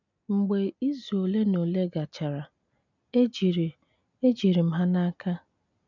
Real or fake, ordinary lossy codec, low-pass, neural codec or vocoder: real; none; 7.2 kHz; none